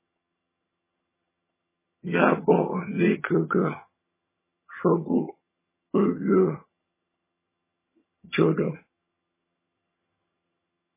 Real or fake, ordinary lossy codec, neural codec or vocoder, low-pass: fake; MP3, 16 kbps; vocoder, 22.05 kHz, 80 mel bands, HiFi-GAN; 3.6 kHz